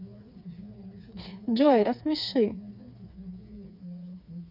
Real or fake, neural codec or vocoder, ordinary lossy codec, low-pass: fake; codec, 16 kHz, 4 kbps, FreqCodec, smaller model; none; 5.4 kHz